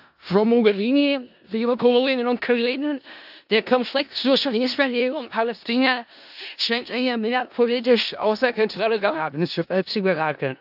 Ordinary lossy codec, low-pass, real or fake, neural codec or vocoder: AAC, 48 kbps; 5.4 kHz; fake; codec, 16 kHz in and 24 kHz out, 0.4 kbps, LongCat-Audio-Codec, four codebook decoder